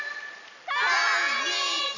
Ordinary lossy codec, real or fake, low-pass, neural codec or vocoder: none; real; 7.2 kHz; none